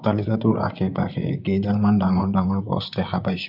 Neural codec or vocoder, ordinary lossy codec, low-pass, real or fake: vocoder, 44.1 kHz, 128 mel bands, Pupu-Vocoder; none; 5.4 kHz; fake